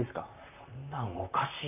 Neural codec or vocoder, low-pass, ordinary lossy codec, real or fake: vocoder, 44.1 kHz, 128 mel bands, Pupu-Vocoder; 3.6 kHz; AAC, 32 kbps; fake